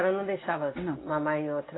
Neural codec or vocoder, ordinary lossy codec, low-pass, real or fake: none; AAC, 16 kbps; 7.2 kHz; real